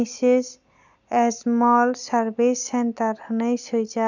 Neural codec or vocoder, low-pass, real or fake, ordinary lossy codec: none; 7.2 kHz; real; none